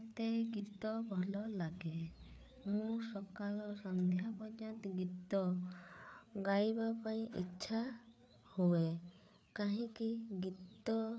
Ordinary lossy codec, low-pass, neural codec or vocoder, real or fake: none; none; codec, 16 kHz, 4 kbps, FreqCodec, larger model; fake